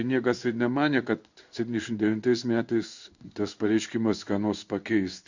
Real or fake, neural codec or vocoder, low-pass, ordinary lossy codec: fake; codec, 16 kHz in and 24 kHz out, 1 kbps, XY-Tokenizer; 7.2 kHz; Opus, 64 kbps